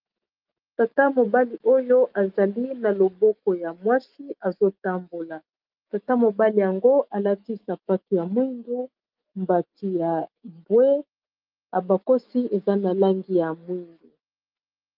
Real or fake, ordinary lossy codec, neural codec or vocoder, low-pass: fake; Opus, 24 kbps; autoencoder, 48 kHz, 128 numbers a frame, DAC-VAE, trained on Japanese speech; 5.4 kHz